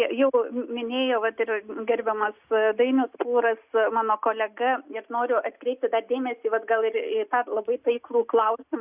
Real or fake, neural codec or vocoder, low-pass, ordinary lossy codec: real; none; 3.6 kHz; Opus, 64 kbps